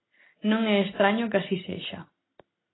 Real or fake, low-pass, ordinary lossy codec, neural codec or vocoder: real; 7.2 kHz; AAC, 16 kbps; none